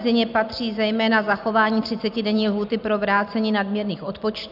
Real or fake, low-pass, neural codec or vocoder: real; 5.4 kHz; none